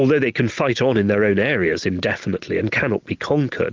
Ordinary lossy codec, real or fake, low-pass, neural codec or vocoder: Opus, 32 kbps; real; 7.2 kHz; none